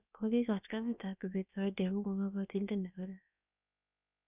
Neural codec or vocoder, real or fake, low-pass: codec, 16 kHz, about 1 kbps, DyCAST, with the encoder's durations; fake; 3.6 kHz